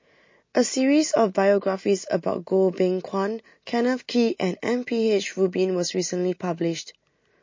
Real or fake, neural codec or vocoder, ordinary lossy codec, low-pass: real; none; MP3, 32 kbps; 7.2 kHz